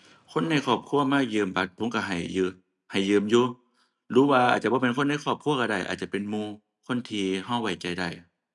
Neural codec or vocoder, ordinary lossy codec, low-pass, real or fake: none; none; 10.8 kHz; real